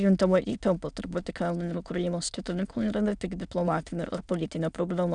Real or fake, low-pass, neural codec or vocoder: fake; 9.9 kHz; autoencoder, 22.05 kHz, a latent of 192 numbers a frame, VITS, trained on many speakers